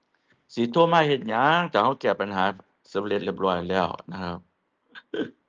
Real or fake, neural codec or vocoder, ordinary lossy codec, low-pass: real; none; Opus, 24 kbps; 7.2 kHz